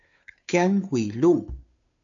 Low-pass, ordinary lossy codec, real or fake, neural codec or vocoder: 7.2 kHz; AAC, 64 kbps; fake; codec, 16 kHz, 2 kbps, FunCodec, trained on Chinese and English, 25 frames a second